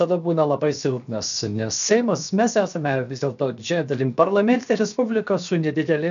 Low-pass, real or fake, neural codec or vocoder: 7.2 kHz; fake; codec, 16 kHz, 0.7 kbps, FocalCodec